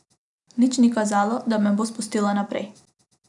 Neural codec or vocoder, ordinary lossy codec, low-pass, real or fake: none; none; 10.8 kHz; real